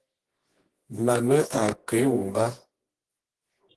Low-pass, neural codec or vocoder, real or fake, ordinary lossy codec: 10.8 kHz; codec, 24 kHz, 0.9 kbps, WavTokenizer, medium music audio release; fake; Opus, 16 kbps